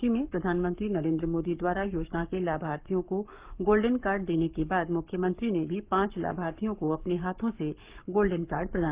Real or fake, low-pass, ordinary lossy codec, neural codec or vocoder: fake; 3.6 kHz; Opus, 32 kbps; codec, 44.1 kHz, 7.8 kbps, Pupu-Codec